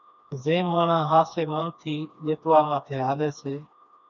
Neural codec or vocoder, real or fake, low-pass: codec, 16 kHz, 2 kbps, FreqCodec, smaller model; fake; 7.2 kHz